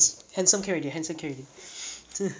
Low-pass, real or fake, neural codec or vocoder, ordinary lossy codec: none; real; none; none